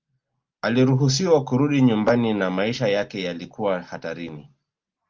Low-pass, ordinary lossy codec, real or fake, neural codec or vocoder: 7.2 kHz; Opus, 32 kbps; real; none